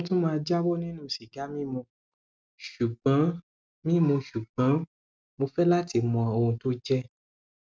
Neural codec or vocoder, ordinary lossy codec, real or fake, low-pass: none; none; real; none